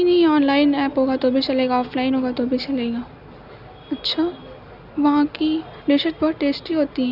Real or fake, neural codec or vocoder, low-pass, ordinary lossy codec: real; none; 5.4 kHz; none